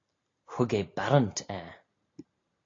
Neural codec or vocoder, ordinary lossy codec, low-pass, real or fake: none; AAC, 32 kbps; 7.2 kHz; real